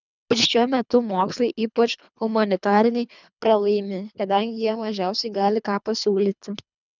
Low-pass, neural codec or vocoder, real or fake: 7.2 kHz; codec, 24 kHz, 3 kbps, HILCodec; fake